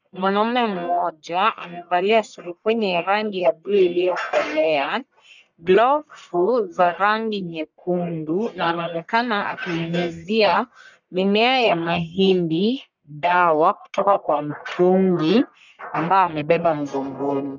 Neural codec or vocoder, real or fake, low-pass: codec, 44.1 kHz, 1.7 kbps, Pupu-Codec; fake; 7.2 kHz